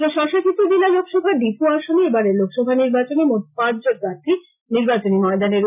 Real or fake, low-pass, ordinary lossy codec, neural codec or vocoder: real; 3.6 kHz; none; none